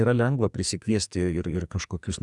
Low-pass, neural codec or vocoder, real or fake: 10.8 kHz; codec, 44.1 kHz, 2.6 kbps, SNAC; fake